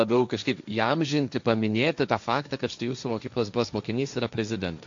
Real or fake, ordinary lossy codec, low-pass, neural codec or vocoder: fake; AAC, 64 kbps; 7.2 kHz; codec, 16 kHz, 1.1 kbps, Voila-Tokenizer